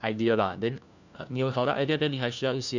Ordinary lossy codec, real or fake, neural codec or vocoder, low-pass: none; fake; codec, 16 kHz, 1 kbps, FunCodec, trained on LibriTTS, 50 frames a second; 7.2 kHz